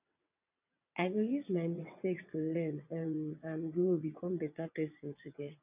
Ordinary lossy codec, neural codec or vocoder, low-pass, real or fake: none; vocoder, 22.05 kHz, 80 mel bands, WaveNeXt; 3.6 kHz; fake